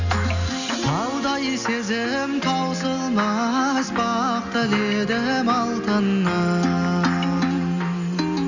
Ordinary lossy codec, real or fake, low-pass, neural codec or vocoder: none; real; 7.2 kHz; none